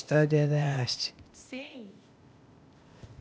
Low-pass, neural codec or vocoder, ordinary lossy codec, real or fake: none; codec, 16 kHz, 0.8 kbps, ZipCodec; none; fake